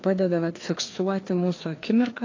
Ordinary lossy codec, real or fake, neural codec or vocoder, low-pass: AAC, 32 kbps; fake; codec, 16 kHz, 2 kbps, FreqCodec, larger model; 7.2 kHz